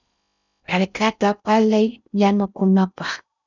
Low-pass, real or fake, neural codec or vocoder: 7.2 kHz; fake; codec, 16 kHz in and 24 kHz out, 0.6 kbps, FocalCodec, streaming, 4096 codes